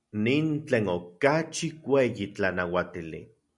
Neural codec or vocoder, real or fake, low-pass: none; real; 10.8 kHz